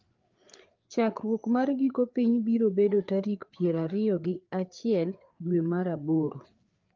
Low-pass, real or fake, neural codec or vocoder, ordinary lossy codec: 7.2 kHz; fake; codec, 16 kHz, 4 kbps, FreqCodec, larger model; Opus, 32 kbps